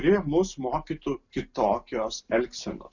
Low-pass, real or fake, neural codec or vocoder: 7.2 kHz; real; none